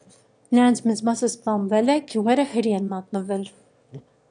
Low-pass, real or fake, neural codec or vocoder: 9.9 kHz; fake; autoencoder, 22.05 kHz, a latent of 192 numbers a frame, VITS, trained on one speaker